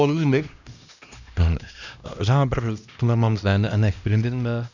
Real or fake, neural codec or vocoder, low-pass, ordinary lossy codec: fake; codec, 16 kHz, 1 kbps, X-Codec, HuBERT features, trained on LibriSpeech; 7.2 kHz; none